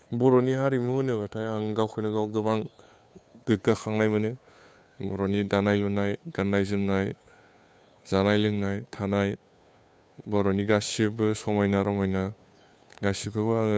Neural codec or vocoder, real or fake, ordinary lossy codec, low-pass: codec, 16 kHz, 2 kbps, FunCodec, trained on Chinese and English, 25 frames a second; fake; none; none